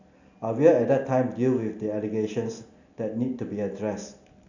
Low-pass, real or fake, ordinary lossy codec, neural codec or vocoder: 7.2 kHz; real; none; none